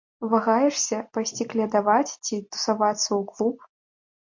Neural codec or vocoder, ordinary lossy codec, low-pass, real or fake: none; MP3, 48 kbps; 7.2 kHz; real